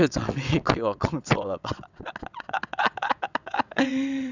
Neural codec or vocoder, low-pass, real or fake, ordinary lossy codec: vocoder, 22.05 kHz, 80 mel bands, WaveNeXt; 7.2 kHz; fake; none